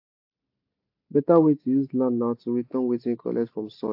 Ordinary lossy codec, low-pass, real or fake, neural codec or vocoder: AAC, 32 kbps; 5.4 kHz; real; none